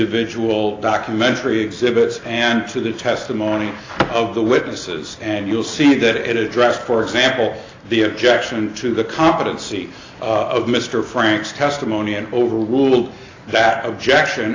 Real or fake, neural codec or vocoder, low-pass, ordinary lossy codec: real; none; 7.2 kHz; AAC, 32 kbps